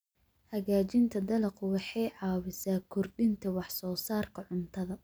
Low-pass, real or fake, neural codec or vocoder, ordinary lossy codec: none; real; none; none